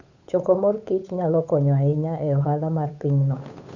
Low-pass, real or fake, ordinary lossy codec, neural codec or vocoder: 7.2 kHz; fake; none; codec, 16 kHz, 8 kbps, FunCodec, trained on Chinese and English, 25 frames a second